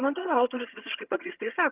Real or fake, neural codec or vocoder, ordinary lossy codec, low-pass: fake; vocoder, 22.05 kHz, 80 mel bands, HiFi-GAN; Opus, 32 kbps; 3.6 kHz